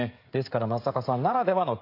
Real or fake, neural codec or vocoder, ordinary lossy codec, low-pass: fake; codec, 16 kHz, 16 kbps, FreqCodec, smaller model; AAC, 32 kbps; 5.4 kHz